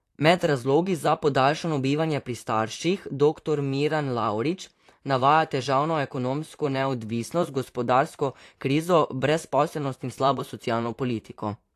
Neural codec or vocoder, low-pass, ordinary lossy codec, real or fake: vocoder, 44.1 kHz, 128 mel bands, Pupu-Vocoder; 14.4 kHz; AAC, 64 kbps; fake